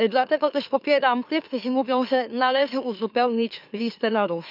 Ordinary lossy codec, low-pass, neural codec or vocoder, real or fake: none; 5.4 kHz; autoencoder, 44.1 kHz, a latent of 192 numbers a frame, MeloTTS; fake